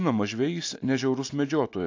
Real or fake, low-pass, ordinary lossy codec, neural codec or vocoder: real; 7.2 kHz; AAC, 48 kbps; none